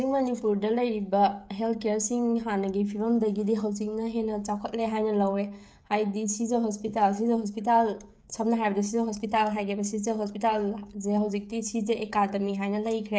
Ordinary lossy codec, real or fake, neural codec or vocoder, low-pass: none; fake; codec, 16 kHz, 16 kbps, FreqCodec, smaller model; none